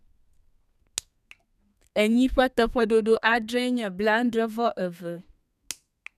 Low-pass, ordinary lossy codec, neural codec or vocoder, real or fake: 14.4 kHz; none; codec, 32 kHz, 1.9 kbps, SNAC; fake